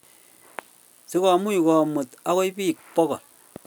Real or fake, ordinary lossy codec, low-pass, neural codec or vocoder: real; none; none; none